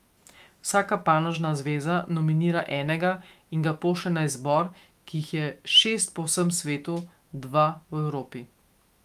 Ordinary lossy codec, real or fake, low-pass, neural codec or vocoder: Opus, 32 kbps; fake; 14.4 kHz; autoencoder, 48 kHz, 128 numbers a frame, DAC-VAE, trained on Japanese speech